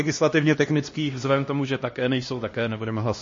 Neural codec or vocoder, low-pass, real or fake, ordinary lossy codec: codec, 16 kHz, 1 kbps, X-Codec, HuBERT features, trained on LibriSpeech; 7.2 kHz; fake; MP3, 32 kbps